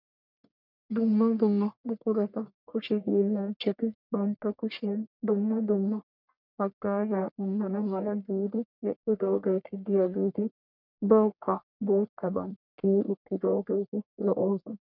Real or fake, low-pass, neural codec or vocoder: fake; 5.4 kHz; codec, 44.1 kHz, 1.7 kbps, Pupu-Codec